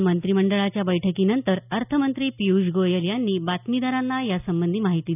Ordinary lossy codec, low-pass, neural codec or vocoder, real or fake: none; 3.6 kHz; none; real